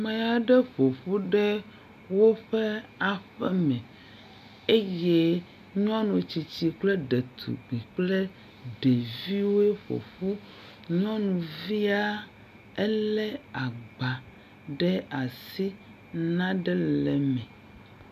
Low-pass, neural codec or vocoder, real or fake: 14.4 kHz; none; real